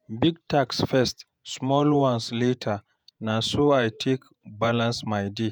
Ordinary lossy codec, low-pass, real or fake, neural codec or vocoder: none; none; fake; vocoder, 48 kHz, 128 mel bands, Vocos